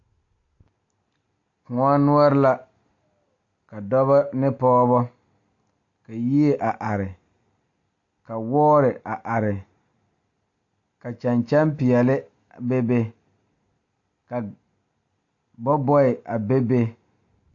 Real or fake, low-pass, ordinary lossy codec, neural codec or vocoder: real; 7.2 kHz; AAC, 48 kbps; none